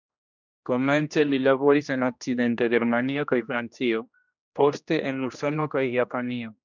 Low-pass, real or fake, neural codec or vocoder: 7.2 kHz; fake; codec, 16 kHz, 1 kbps, X-Codec, HuBERT features, trained on general audio